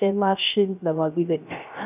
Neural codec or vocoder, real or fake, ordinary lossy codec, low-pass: codec, 16 kHz, 0.3 kbps, FocalCodec; fake; none; 3.6 kHz